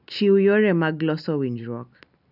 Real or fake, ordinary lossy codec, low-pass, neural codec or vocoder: real; none; 5.4 kHz; none